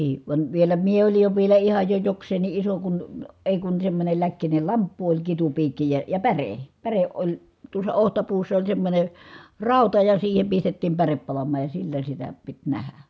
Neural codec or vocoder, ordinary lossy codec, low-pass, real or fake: none; none; none; real